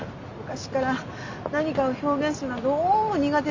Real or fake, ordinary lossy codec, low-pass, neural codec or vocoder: real; MP3, 48 kbps; 7.2 kHz; none